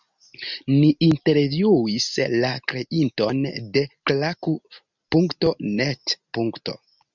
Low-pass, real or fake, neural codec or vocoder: 7.2 kHz; real; none